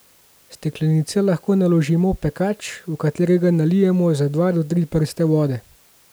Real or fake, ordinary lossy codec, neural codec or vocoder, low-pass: real; none; none; none